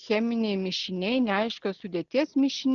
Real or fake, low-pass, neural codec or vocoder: real; 7.2 kHz; none